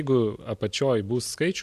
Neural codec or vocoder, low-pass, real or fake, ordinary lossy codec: none; 14.4 kHz; real; MP3, 64 kbps